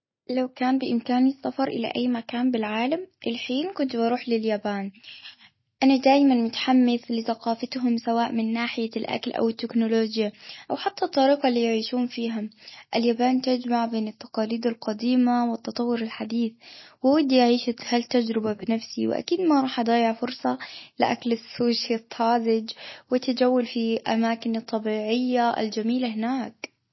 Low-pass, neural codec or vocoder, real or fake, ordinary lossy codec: 7.2 kHz; none; real; MP3, 24 kbps